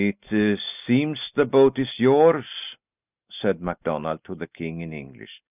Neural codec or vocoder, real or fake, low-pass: none; real; 3.6 kHz